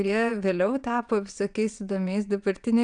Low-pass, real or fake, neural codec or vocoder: 9.9 kHz; fake; vocoder, 22.05 kHz, 80 mel bands, WaveNeXt